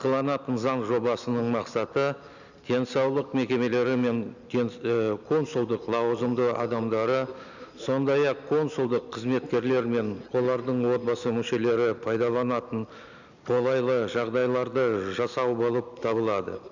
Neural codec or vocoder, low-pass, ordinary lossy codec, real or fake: none; 7.2 kHz; none; real